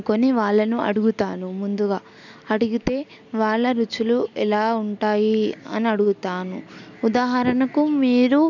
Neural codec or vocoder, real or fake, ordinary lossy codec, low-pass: none; real; none; 7.2 kHz